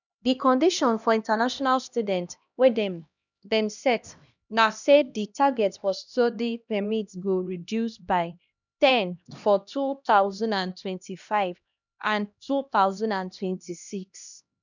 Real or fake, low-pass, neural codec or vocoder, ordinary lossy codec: fake; 7.2 kHz; codec, 16 kHz, 1 kbps, X-Codec, HuBERT features, trained on LibriSpeech; none